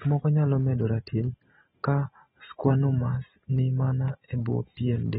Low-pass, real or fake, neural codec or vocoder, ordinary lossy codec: 7.2 kHz; real; none; AAC, 16 kbps